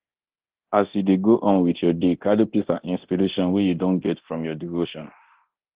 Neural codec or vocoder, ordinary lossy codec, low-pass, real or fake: codec, 24 kHz, 0.9 kbps, DualCodec; Opus, 16 kbps; 3.6 kHz; fake